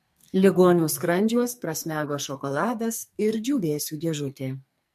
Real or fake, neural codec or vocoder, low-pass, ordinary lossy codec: fake; codec, 44.1 kHz, 2.6 kbps, SNAC; 14.4 kHz; MP3, 64 kbps